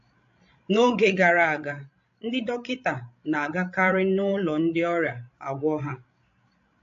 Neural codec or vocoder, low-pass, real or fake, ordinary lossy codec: codec, 16 kHz, 16 kbps, FreqCodec, larger model; 7.2 kHz; fake; AAC, 48 kbps